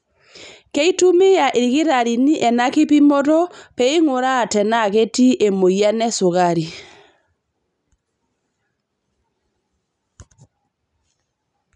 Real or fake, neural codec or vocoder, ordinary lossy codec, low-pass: real; none; none; 10.8 kHz